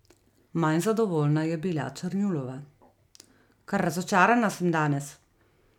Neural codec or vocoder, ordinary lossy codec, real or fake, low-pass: none; none; real; 19.8 kHz